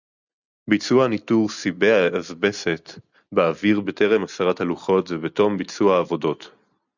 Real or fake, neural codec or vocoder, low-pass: real; none; 7.2 kHz